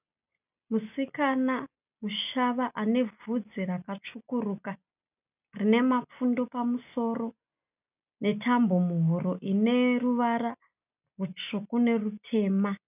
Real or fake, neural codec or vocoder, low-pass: real; none; 3.6 kHz